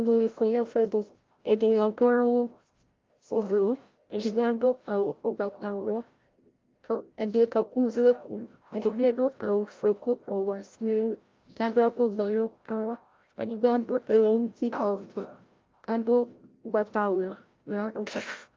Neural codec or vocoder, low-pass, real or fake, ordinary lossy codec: codec, 16 kHz, 0.5 kbps, FreqCodec, larger model; 7.2 kHz; fake; Opus, 32 kbps